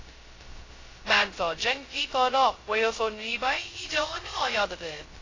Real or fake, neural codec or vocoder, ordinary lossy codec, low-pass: fake; codec, 16 kHz, 0.2 kbps, FocalCodec; AAC, 32 kbps; 7.2 kHz